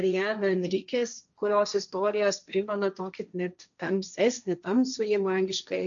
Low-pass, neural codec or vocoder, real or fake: 7.2 kHz; codec, 16 kHz, 1.1 kbps, Voila-Tokenizer; fake